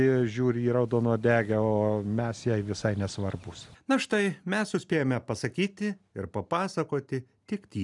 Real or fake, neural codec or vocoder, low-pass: real; none; 10.8 kHz